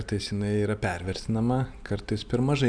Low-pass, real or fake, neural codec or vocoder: 9.9 kHz; real; none